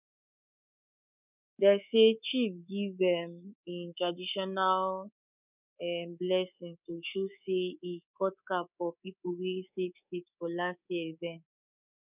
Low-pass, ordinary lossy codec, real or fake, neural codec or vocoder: 3.6 kHz; none; fake; codec, 24 kHz, 3.1 kbps, DualCodec